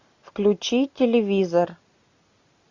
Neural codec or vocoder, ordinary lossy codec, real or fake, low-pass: none; Opus, 64 kbps; real; 7.2 kHz